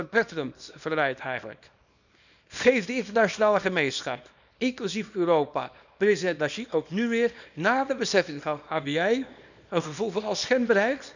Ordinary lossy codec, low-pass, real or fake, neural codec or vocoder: none; 7.2 kHz; fake; codec, 24 kHz, 0.9 kbps, WavTokenizer, small release